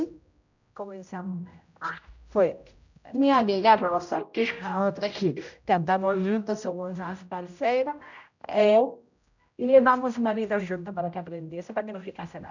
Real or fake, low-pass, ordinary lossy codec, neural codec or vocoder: fake; 7.2 kHz; none; codec, 16 kHz, 0.5 kbps, X-Codec, HuBERT features, trained on general audio